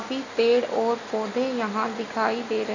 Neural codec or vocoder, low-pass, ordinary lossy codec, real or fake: none; 7.2 kHz; none; real